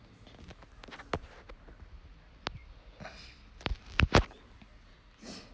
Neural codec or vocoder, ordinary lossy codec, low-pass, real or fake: none; none; none; real